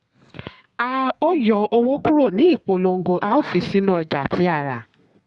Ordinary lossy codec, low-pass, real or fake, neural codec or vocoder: Opus, 64 kbps; 10.8 kHz; fake; codec, 32 kHz, 1.9 kbps, SNAC